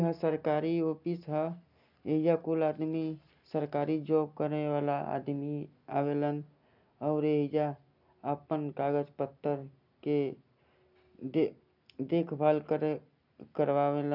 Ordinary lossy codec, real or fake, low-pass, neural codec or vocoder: none; real; 5.4 kHz; none